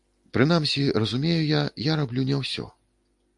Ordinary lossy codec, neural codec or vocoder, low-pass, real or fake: AAC, 64 kbps; none; 10.8 kHz; real